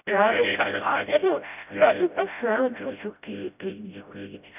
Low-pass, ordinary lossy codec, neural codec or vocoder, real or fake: 3.6 kHz; none; codec, 16 kHz, 0.5 kbps, FreqCodec, smaller model; fake